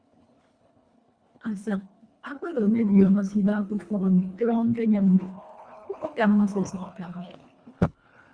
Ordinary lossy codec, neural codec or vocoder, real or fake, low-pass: Opus, 64 kbps; codec, 24 kHz, 1.5 kbps, HILCodec; fake; 9.9 kHz